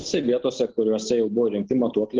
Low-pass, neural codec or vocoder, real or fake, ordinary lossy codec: 7.2 kHz; none; real; Opus, 16 kbps